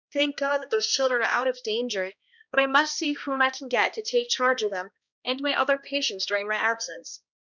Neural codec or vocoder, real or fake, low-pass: codec, 16 kHz, 1 kbps, X-Codec, HuBERT features, trained on balanced general audio; fake; 7.2 kHz